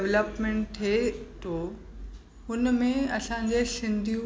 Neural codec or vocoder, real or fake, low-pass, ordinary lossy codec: none; real; none; none